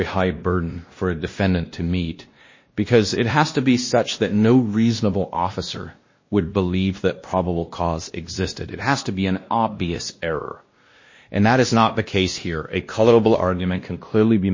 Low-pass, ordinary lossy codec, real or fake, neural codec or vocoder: 7.2 kHz; MP3, 32 kbps; fake; codec, 16 kHz, 1 kbps, X-Codec, WavLM features, trained on Multilingual LibriSpeech